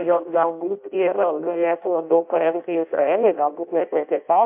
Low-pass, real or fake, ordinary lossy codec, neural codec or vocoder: 3.6 kHz; fake; MP3, 32 kbps; codec, 16 kHz in and 24 kHz out, 0.6 kbps, FireRedTTS-2 codec